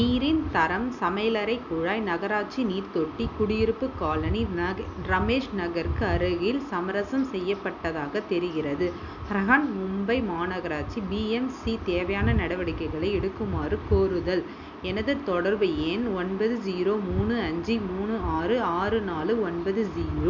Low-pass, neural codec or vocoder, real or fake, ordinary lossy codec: 7.2 kHz; none; real; none